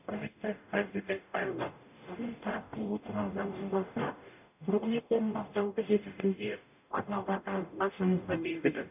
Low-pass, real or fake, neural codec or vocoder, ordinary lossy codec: 3.6 kHz; fake; codec, 44.1 kHz, 0.9 kbps, DAC; none